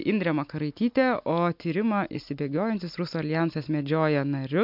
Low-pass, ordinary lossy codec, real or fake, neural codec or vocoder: 5.4 kHz; MP3, 48 kbps; real; none